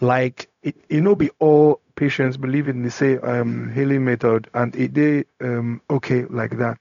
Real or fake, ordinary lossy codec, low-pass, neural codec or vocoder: fake; none; 7.2 kHz; codec, 16 kHz, 0.4 kbps, LongCat-Audio-Codec